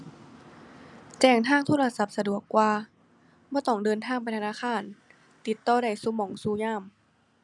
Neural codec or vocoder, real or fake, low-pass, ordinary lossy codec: none; real; none; none